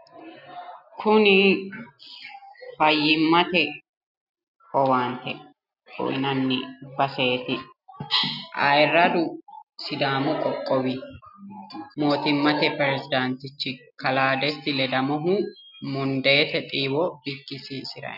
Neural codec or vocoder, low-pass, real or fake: none; 5.4 kHz; real